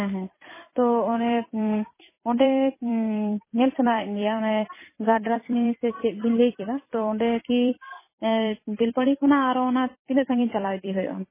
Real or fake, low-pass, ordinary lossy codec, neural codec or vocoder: fake; 3.6 kHz; MP3, 16 kbps; vocoder, 44.1 kHz, 128 mel bands every 256 samples, BigVGAN v2